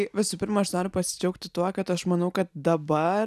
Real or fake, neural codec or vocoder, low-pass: real; none; 14.4 kHz